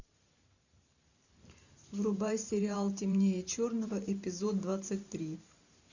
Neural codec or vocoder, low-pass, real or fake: vocoder, 44.1 kHz, 128 mel bands every 512 samples, BigVGAN v2; 7.2 kHz; fake